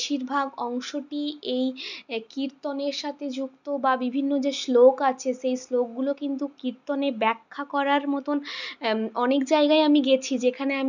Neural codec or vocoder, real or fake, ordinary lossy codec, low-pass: none; real; none; 7.2 kHz